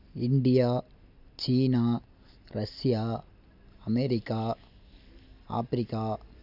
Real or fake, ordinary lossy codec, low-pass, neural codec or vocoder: real; none; 5.4 kHz; none